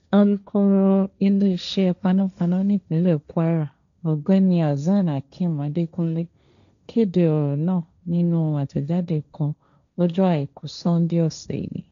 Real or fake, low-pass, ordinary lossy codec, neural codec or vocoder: fake; 7.2 kHz; none; codec, 16 kHz, 1.1 kbps, Voila-Tokenizer